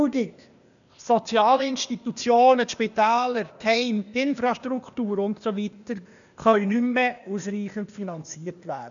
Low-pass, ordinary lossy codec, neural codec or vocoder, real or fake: 7.2 kHz; none; codec, 16 kHz, 0.8 kbps, ZipCodec; fake